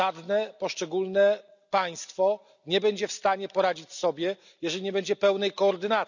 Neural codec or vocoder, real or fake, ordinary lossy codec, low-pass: none; real; none; 7.2 kHz